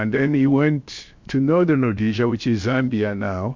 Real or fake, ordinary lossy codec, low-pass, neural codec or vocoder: fake; MP3, 48 kbps; 7.2 kHz; codec, 16 kHz, 0.7 kbps, FocalCodec